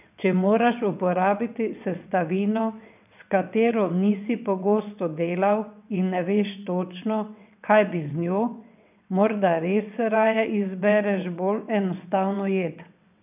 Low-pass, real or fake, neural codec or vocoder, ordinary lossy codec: 3.6 kHz; fake; vocoder, 22.05 kHz, 80 mel bands, WaveNeXt; none